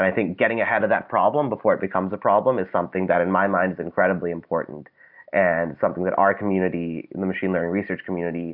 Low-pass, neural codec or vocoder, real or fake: 5.4 kHz; none; real